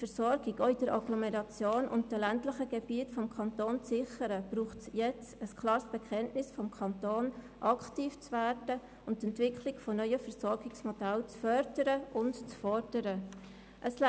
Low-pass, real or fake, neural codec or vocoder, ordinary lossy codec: none; real; none; none